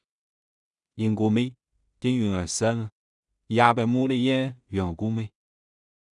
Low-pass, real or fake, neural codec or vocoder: 10.8 kHz; fake; codec, 16 kHz in and 24 kHz out, 0.4 kbps, LongCat-Audio-Codec, two codebook decoder